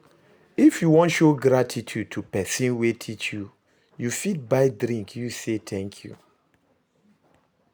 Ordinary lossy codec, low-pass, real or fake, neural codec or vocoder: none; none; real; none